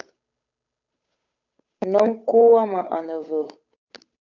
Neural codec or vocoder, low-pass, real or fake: codec, 16 kHz, 8 kbps, FunCodec, trained on Chinese and English, 25 frames a second; 7.2 kHz; fake